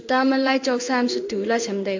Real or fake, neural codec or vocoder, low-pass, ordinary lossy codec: fake; codec, 16 kHz in and 24 kHz out, 1 kbps, XY-Tokenizer; 7.2 kHz; AAC, 48 kbps